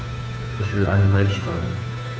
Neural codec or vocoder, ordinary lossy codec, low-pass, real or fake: codec, 16 kHz, 2 kbps, FunCodec, trained on Chinese and English, 25 frames a second; none; none; fake